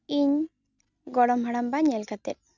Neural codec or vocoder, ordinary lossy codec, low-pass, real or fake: none; none; 7.2 kHz; real